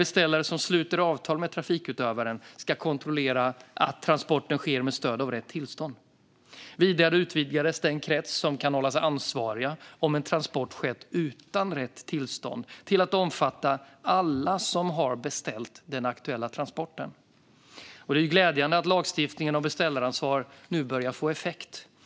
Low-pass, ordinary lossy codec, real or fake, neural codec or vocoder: none; none; real; none